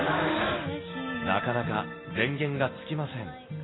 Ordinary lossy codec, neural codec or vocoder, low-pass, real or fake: AAC, 16 kbps; none; 7.2 kHz; real